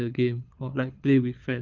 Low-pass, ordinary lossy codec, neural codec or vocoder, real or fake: 7.2 kHz; Opus, 24 kbps; codec, 24 kHz, 6 kbps, HILCodec; fake